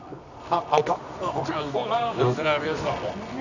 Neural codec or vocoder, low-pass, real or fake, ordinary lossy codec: codec, 24 kHz, 0.9 kbps, WavTokenizer, medium music audio release; 7.2 kHz; fake; none